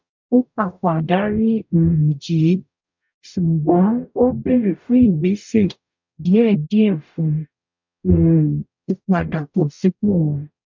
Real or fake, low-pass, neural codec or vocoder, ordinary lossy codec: fake; 7.2 kHz; codec, 44.1 kHz, 0.9 kbps, DAC; MP3, 64 kbps